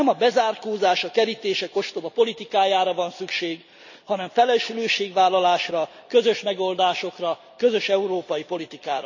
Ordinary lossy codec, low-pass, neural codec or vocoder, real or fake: none; 7.2 kHz; none; real